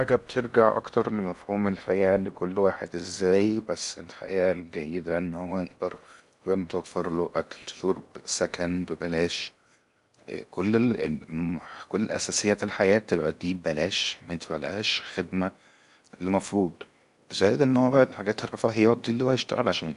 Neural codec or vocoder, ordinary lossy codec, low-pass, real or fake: codec, 16 kHz in and 24 kHz out, 0.8 kbps, FocalCodec, streaming, 65536 codes; none; 10.8 kHz; fake